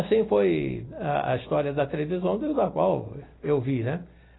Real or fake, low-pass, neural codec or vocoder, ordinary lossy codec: real; 7.2 kHz; none; AAC, 16 kbps